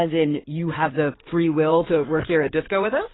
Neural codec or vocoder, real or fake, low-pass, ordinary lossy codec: codec, 16 kHz in and 24 kHz out, 2.2 kbps, FireRedTTS-2 codec; fake; 7.2 kHz; AAC, 16 kbps